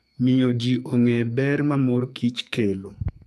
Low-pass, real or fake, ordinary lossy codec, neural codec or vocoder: 14.4 kHz; fake; none; codec, 44.1 kHz, 2.6 kbps, SNAC